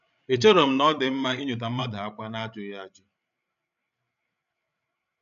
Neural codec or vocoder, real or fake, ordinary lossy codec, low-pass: codec, 16 kHz, 16 kbps, FreqCodec, larger model; fake; none; 7.2 kHz